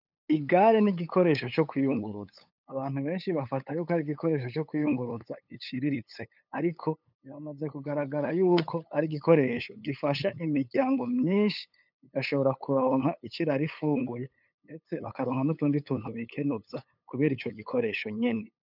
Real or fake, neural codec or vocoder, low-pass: fake; codec, 16 kHz, 8 kbps, FunCodec, trained on LibriTTS, 25 frames a second; 5.4 kHz